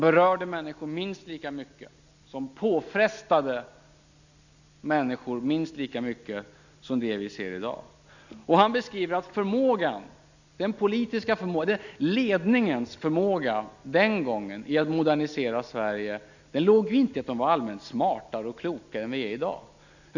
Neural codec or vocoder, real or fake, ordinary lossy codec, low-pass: none; real; none; 7.2 kHz